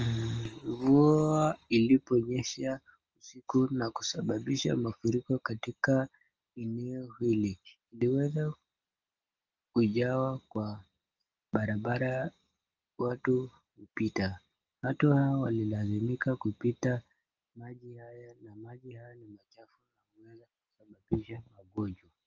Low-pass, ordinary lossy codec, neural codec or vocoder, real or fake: 7.2 kHz; Opus, 24 kbps; none; real